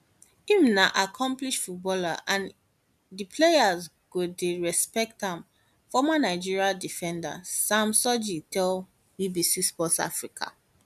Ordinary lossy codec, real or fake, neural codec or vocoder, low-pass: none; real; none; 14.4 kHz